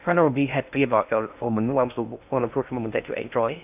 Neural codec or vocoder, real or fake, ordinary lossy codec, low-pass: codec, 16 kHz in and 24 kHz out, 0.6 kbps, FocalCodec, streaming, 2048 codes; fake; none; 3.6 kHz